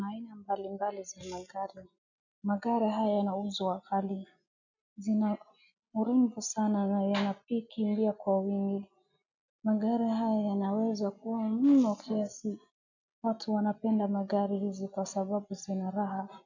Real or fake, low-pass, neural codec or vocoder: real; 7.2 kHz; none